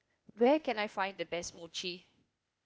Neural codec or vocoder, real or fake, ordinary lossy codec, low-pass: codec, 16 kHz, 0.8 kbps, ZipCodec; fake; none; none